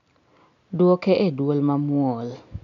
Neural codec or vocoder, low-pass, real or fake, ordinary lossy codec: none; 7.2 kHz; real; none